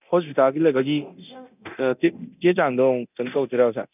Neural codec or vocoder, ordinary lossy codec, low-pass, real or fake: codec, 24 kHz, 0.9 kbps, DualCodec; none; 3.6 kHz; fake